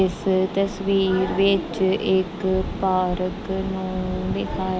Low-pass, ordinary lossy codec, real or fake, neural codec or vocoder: none; none; real; none